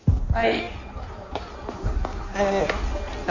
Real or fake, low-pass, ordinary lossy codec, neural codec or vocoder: fake; 7.2 kHz; none; codec, 16 kHz in and 24 kHz out, 1.1 kbps, FireRedTTS-2 codec